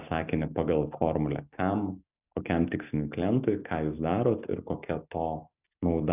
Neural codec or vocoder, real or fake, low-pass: none; real; 3.6 kHz